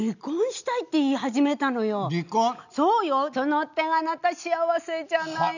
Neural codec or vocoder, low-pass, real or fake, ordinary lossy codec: none; 7.2 kHz; real; none